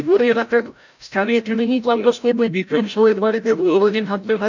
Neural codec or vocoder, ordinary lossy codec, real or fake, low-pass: codec, 16 kHz, 0.5 kbps, FreqCodec, larger model; none; fake; 7.2 kHz